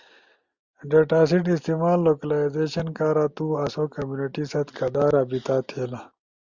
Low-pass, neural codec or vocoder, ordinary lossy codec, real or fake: 7.2 kHz; none; Opus, 64 kbps; real